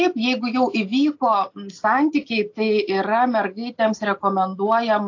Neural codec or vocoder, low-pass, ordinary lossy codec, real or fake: none; 7.2 kHz; AAC, 48 kbps; real